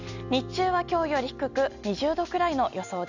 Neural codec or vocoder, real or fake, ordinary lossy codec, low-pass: none; real; none; 7.2 kHz